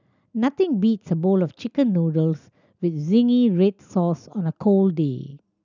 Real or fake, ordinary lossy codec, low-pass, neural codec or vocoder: real; none; 7.2 kHz; none